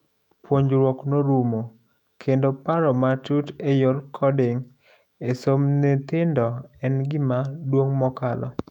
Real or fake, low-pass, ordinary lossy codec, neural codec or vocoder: fake; 19.8 kHz; none; autoencoder, 48 kHz, 128 numbers a frame, DAC-VAE, trained on Japanese speech